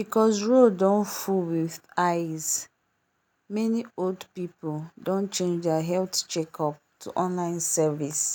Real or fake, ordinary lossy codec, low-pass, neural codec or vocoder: real; none; none; none